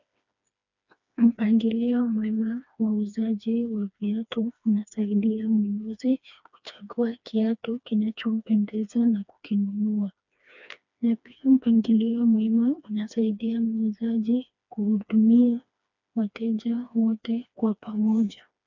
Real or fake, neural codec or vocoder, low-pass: fake; codec, 16 kHz, 2 kbps, FreqCodec, smaller model; 7.2 kHz